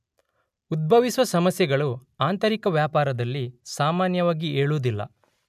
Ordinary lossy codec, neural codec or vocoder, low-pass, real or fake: none; none; 14.4 kHz; real